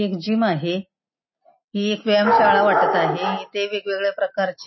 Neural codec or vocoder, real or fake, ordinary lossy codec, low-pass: none; real; MP3, 24 kbps; 7.2 kHz